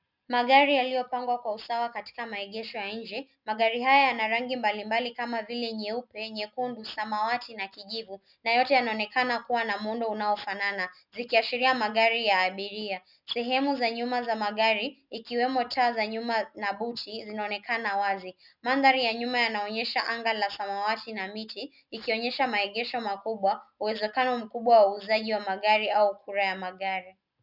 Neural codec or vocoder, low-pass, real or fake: none; 5.4 kHz; real